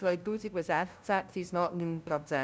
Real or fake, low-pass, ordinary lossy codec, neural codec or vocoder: fake; none; none; codec, 16 kHz, 0.5 kbps, FunCodec, trained on LibriTTS, 25 frames a second